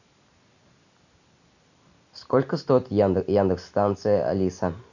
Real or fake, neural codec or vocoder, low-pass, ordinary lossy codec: real; none; 7.2 kHz; none